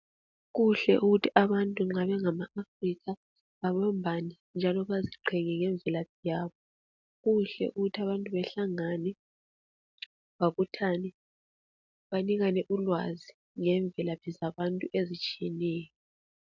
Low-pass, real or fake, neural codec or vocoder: 7.2 kHz; real; none